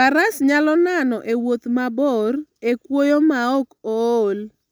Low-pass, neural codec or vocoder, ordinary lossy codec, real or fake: none; none; none; real